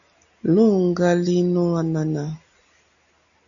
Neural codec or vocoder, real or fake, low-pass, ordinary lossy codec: none; real; 7.2 kHz; MP3, 96 kbps